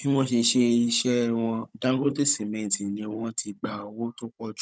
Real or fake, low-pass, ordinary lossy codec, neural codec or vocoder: fake; none; none; codec, 16 kHz, 16 kbps, FunCodec, trained on Chinese and English, 50 frames a second